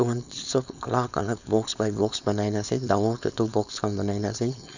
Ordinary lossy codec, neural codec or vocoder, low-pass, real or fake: none; codec, 16 kHz, 4.8 kbps, FACodec; 7.2 kHz; fake